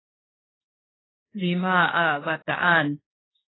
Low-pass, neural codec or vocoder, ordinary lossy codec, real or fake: 7.2 kHz; codec, 16 kHz, 1.1 kbps, Voila-Tokenizer; AAC, 16 kbps; fake